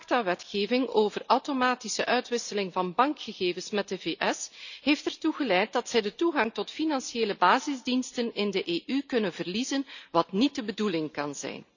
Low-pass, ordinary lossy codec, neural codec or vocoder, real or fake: 7.2 kHz; none; none; real